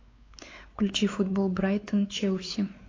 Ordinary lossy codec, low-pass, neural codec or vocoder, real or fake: AAC, 32 kbps; 7.2 kHz; codec, 16 kHz, 4 kbps, X-Codec, WavLM features, trained on Multilingual LibriSpeech; fake